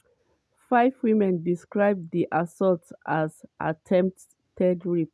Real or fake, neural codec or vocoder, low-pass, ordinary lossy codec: real; none; none; none